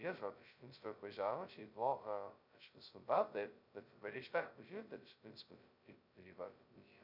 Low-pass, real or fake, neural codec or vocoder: 5.4 kHz; fake; codec, 16 kHz, 0.2 kbps, FocalCodec